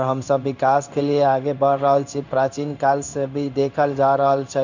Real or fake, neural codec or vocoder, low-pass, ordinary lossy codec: fake; codec, 16 kHz in and 24 kHz out, 1 kbps, XY-Tokenizer; 7.2 kHz; none